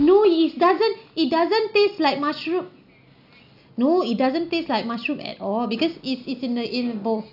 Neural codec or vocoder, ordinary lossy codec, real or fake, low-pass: none; AAC, 48 kbps; real; 5.4 kHz